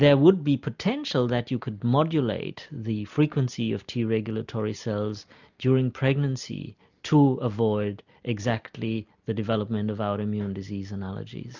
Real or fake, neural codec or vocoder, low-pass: real; none; 7.2 kHz